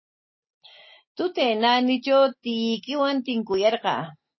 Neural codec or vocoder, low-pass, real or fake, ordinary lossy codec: none; 7.2 kHz; real; MP3, 24 kbps